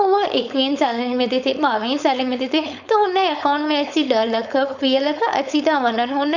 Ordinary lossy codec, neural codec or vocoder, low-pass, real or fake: none; codec, 16 kHz, 4.8 kbps, FACodec; 7.2 kHz; fake